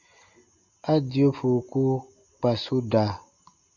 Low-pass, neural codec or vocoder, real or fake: 7.2 kHz; none; real